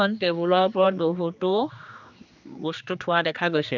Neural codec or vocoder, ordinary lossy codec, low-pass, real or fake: codec, 16 kHz, 2 kbps, X-Codec, HuBERT features, trained on general audio; none; 7.2 kHz; fake